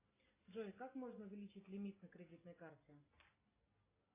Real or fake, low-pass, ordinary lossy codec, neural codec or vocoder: real; 3.6 kHz; AAC, 24 kbps; none